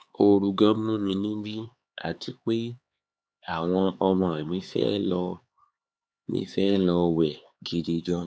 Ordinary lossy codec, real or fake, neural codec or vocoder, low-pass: none; fake; codec, 16 kHz, 4 kbps, X-Codec, HuBERT features, trained on LibriSpeech; none